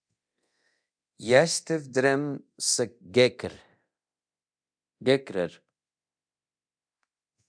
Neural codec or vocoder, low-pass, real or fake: codec, 24 kHz, 0.9 kbps, DualCodec; 9.9 kHz; fake